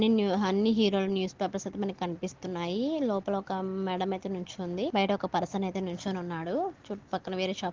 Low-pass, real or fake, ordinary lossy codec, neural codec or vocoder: 7.2 kHz; real; Opus, 16 kbps; none